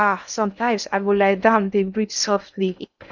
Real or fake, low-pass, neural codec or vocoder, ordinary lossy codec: fake; 7.2 kHz; codec, 16 kHz in and 24 kHz out, 0.6 kbps, FocalCodec, streaming, 2048 codes; none